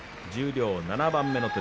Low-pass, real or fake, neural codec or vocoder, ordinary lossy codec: none; real; none; none